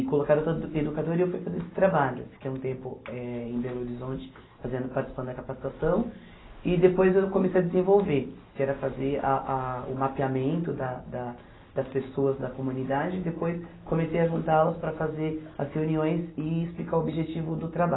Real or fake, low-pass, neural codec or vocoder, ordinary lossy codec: real; 7.2 kHz; none; AAC, 16 kbps